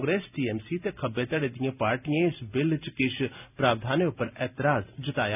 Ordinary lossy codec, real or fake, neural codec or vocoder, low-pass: none; real; none; 3.6 kHz